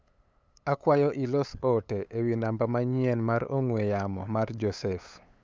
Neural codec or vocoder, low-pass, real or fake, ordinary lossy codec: codec, 16 kHz, 8 kbps, FunCodec, trained on LibriTTS, 25 frames a second; none; fake; none